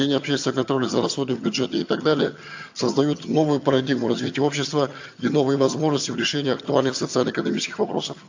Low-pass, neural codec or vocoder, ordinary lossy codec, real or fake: 7.2 kHz; vocoder, 22.05 kHz, 80 mel bands, HiFi-GAN; AAC, 48 kbps; fake